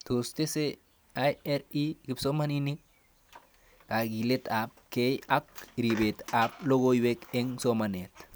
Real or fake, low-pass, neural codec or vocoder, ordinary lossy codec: real; none; none; none